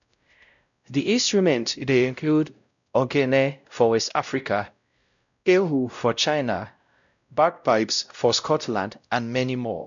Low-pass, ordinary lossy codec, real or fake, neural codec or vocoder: 7.2 kHz; MP3, 96 kbps; fake; codec, 16 kHz, 0.5 kbps, X-Codec, WavLM features, trained on Multilingual LibriSpeech